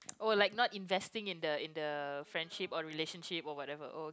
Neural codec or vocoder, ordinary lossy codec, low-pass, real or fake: none; none; none; real